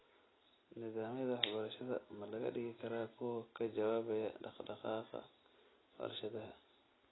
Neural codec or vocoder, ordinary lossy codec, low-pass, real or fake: none; AAC, 16 kbps; 7.2 kHz; real